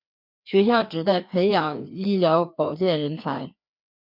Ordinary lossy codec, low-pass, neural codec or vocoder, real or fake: MP3, 48 kbps; 5.4 kHz; codec, 16 kHz, 2 kbps, FreqCodec, larger model; fake